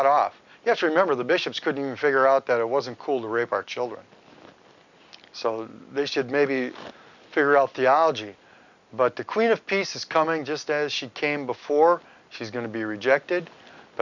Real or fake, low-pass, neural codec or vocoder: real; 7.2 kHz; none